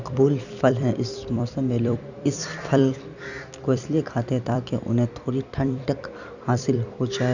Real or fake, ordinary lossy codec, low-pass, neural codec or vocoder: fake; none; 7.2 kHz; vocoder, 44.1 kHz, 128 mel bands every 256 samples, BigVGAN v2